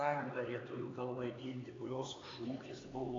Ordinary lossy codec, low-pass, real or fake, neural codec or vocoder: AAC, 32 kbps; 7.2 kHz; fake; codec, 16 kHz, 4 kbps, X-Codec, HuBERT features, trained on LibriSpeech